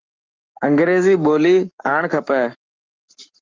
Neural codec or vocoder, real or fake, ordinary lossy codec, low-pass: codec, 44.1 kHz, 7.8 kbps, Pupu-Codec; fake; Opus, 24 kbps; 7.2 kHz